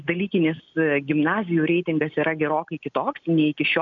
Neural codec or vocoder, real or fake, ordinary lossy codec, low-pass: none; real; MP3, 96 kbps; 7.2 kHz